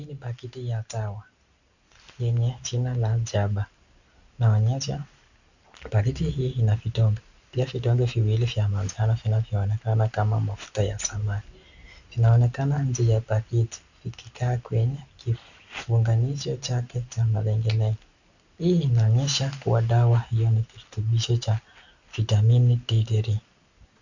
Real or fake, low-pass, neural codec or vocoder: real; 7.2 kHz; none